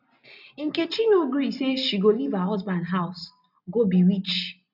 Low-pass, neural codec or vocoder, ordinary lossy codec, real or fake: 5.4 kHz; none; none; real